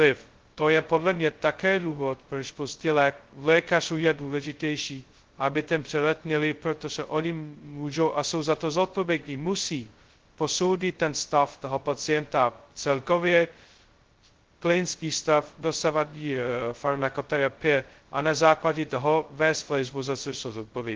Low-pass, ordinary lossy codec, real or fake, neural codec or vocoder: 7.2 kHz; Opus, 16 kbps; fake; codec, 16 kHz, 0.2 kbps, FocalCodec